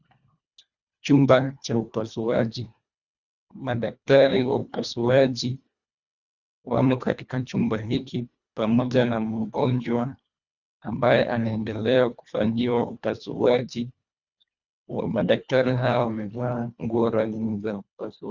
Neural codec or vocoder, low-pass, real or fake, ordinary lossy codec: codec, 24 kHz, 1.5 kbps, HILCodec; 7.2 kHz; fake; Opus, 64 kbps